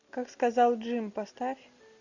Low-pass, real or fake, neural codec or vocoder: 7.2 kHz; real; none